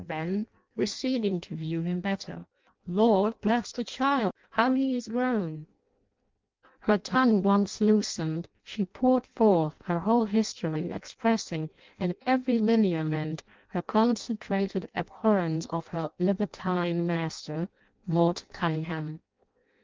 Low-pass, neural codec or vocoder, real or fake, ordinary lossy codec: 7.2 kHz; codec, 16 kHz in and 24 kHz out, 0.6 kbps, FireRedTTS-2 codec; fake; Opus, 24 kbps